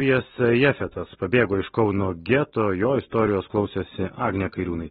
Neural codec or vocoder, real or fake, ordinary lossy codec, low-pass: none; real; AAC, 16 kbps; 19.8 kHz